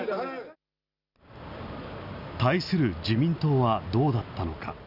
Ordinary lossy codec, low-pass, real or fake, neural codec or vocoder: none; 5.4 kHz; real; none